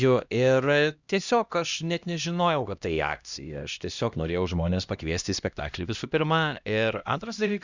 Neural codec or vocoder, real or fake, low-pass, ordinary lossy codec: codec, 16 kHz, 1 kbps, X-Codec, HuBERT features, trained on LibriSpeech; fake; 7.2 kHz; Opus, 64 kbps